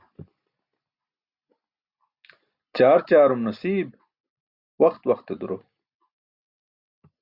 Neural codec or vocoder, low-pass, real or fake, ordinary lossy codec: none; 5.4 kHz; real; Opus, 64 kbps